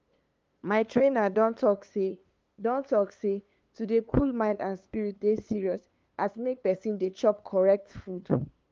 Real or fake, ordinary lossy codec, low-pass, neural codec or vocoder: fake; Opus, 24 kbps; 7.2 kHz; codec, 16 kHz, 2 kbps, FunCodec, trained on LibriTTS, 25 frames a second